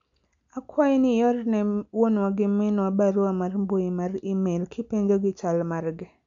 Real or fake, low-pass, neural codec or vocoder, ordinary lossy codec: real; 7.2 kHz; none; AAC, 64 kbps